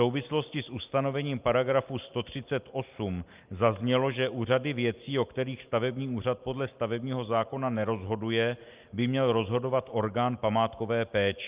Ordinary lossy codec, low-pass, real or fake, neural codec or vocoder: Opus, 24 kbps; 3.6 kHz; real; none